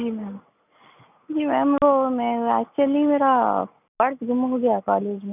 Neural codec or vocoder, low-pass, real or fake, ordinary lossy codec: none; 3.6 kHz; real; none